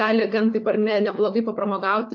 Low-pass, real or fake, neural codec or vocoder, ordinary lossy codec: 7.2 kHz; fake; codec, 16 kHz, 4 kbps, FunCodec, trained on LibriTTS, 50 frames a second; AAC, 48 kbps